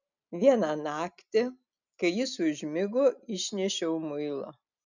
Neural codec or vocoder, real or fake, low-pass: none; real; 7.2 kHz